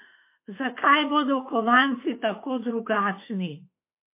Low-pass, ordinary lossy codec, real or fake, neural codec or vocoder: 3.6 kHz; MP3, 24 kbps; fake; autoencoder, 48 kHz, 32 numbers a frame, DAC-VAE, trained on Japanese speech